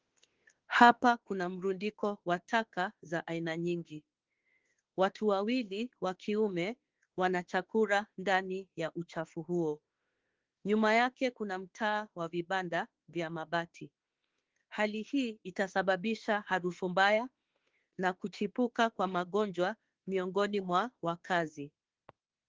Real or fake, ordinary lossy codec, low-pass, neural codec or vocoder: fake; Opus, 16 kbps; 7.2 kHz; autoencoder, 48 kHz, 32 numbers a frame, DAC-VAE, trained on Japanese speech